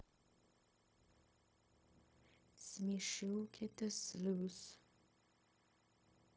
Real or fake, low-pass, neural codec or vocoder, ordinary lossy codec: fake; none; codec, 16 kHz, 0.4 kbps, LongCat-Audio-Codec; none